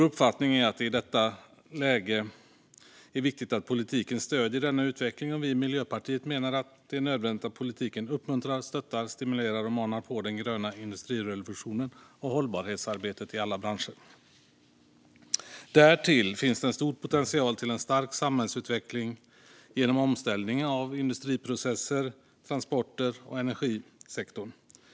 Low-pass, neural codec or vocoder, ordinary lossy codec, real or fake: none; none; none; real